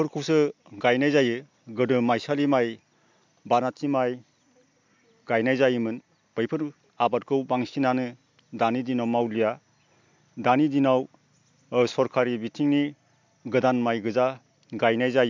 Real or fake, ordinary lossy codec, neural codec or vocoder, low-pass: real; MP3, 64 kbps; none; 7.2 kHz